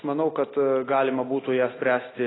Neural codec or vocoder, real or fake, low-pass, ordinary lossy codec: none; real; 7.2 kHz; AAC, 16 kbps